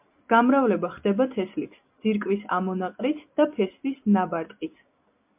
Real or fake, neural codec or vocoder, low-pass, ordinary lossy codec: real; none; 3.6 kHz; MP3, 32 kbps